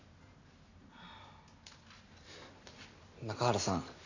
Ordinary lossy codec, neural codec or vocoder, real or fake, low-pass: none; none; real; 7.2 kHz